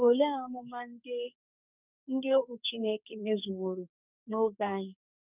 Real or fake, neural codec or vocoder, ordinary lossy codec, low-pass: fake; codec, 44.1 kHz, 2.6 kbps, SNAC; none; 3.6 kHz